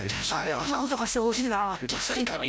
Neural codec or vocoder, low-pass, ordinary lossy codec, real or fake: codec, 16 kHz, 0.5 kbps, FreqCodec, larger model; none; none; fake